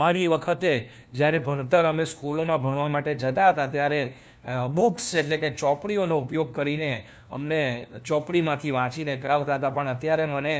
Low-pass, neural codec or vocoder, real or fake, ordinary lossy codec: none; codec, 16 kHz, 1 kbps, FunCodec, trained on LibriTTS, 50 frames a second; fake; none